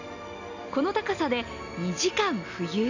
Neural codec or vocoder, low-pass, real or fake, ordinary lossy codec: none; 7.2 kHz; real; none